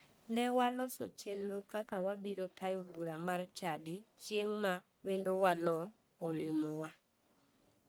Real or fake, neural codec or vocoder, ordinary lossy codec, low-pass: fake; codec, 44.1 kHz, 1.7 kbps, Pupu-Codec; none; none